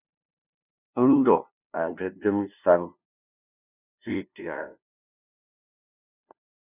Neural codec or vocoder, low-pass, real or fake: codec, 16 kHz, 0.5 kbps, FunCodec, trained on LibriTTS, 25 frames a second; 3.6 kHz; fake